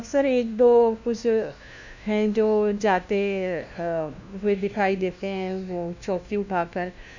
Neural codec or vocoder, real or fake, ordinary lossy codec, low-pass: codec, 16 kHz, 1 kbps, FunCodec, trained on LibriTTS, 50 frames a second; fake; none; 7.2 kHz